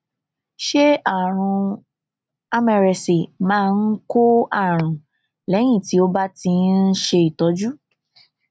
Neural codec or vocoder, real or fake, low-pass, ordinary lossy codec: none; real; none; none